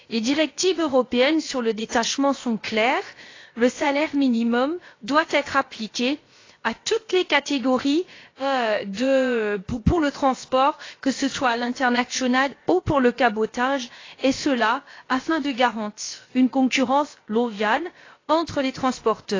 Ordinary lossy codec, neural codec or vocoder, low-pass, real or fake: AAC, 32 kbps; codec, 16 kHz, about 1 kbps, DyCAST, with the encoder's durations; 7.2 kHz; fake